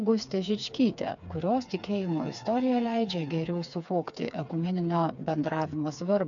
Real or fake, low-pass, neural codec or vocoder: fake; 7.2 kHz; codec, 16 kHz, 4 kbps, FreqCodec, smaller model